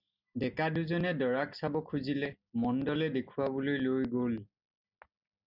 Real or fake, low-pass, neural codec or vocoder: real; 5.4 kHz; none